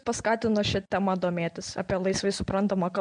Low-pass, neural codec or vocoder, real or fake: 9.9 kHz; none; real